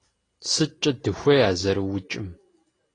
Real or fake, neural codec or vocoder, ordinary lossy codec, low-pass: real; none; AAC, 32 kbps; 9.9 kHz